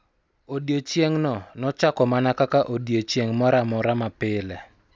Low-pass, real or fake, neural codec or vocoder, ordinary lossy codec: none; real; none; none